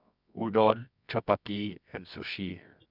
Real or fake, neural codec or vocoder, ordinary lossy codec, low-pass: fake; codec, 24 kHz, 0.9 kbps, WavTokenizer, medium music audio release; none; 5.4 kHz